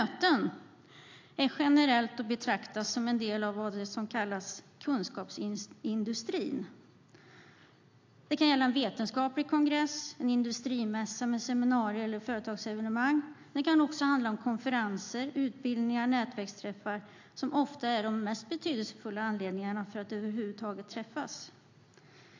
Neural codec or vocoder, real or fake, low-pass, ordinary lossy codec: none; real; 7.2 kHz; AAC, 48 kbps